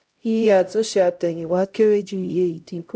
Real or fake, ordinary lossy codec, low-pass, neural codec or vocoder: fake; none; none; codec, 16 kHz, 0.5 kbps, X-Codec, HuBERT features, trained on LibriSpeech